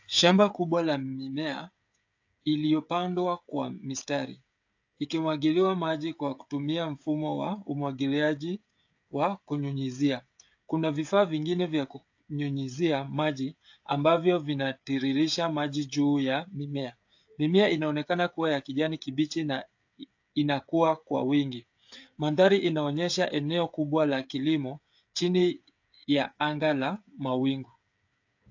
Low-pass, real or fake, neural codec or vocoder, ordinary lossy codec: 7.2 kHz; fake; codec, 16 kHz, 16 kbps, FreqCodec, smaller model; AAC, 48 kbps